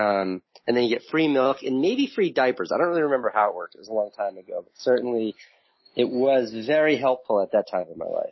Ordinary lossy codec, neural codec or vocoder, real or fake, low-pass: MP3, 24 kbps; none; real; 7.2 kHz